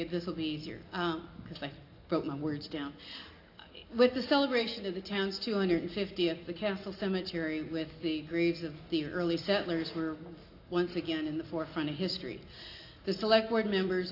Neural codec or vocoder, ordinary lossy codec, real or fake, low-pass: none; AAC, 32 kbps; real; 5.4 kHz